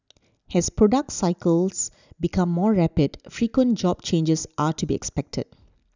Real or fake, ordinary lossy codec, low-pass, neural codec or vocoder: real; none; 7.2 kHz; none